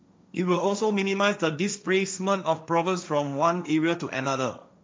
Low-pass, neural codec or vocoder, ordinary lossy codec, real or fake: none; codec, 16 kHz, 1.1 kbps, Voila-Tokenizer; none; fake